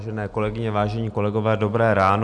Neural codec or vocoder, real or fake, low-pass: none; real; 10.8 kHz